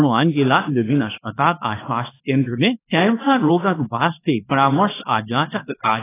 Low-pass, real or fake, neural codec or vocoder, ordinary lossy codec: 3.6 kHz; fake; codec, 24 kHz, 0.9 kbps, WavTokenizer, small release; AAC, 16 kbps